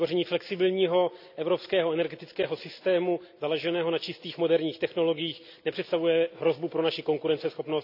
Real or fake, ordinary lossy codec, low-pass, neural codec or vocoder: real; none; 5.4 kHz; none